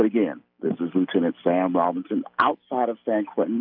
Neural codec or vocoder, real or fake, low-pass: none; real; 5.4 kHz